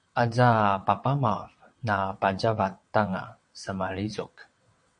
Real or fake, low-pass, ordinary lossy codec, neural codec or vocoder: fake; 9.9 kHz; MP3, 48 kbps; vocoder, 22.05 kHz, 80 mel bands, WaveNeXt